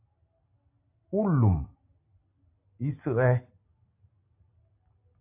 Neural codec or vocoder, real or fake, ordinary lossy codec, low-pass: none; real; AAC, 32 kbps; 3.6 kHz